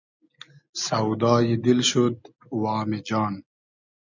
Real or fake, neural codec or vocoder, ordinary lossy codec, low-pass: real; none; MP3, 64 kbps; 7.2 kHz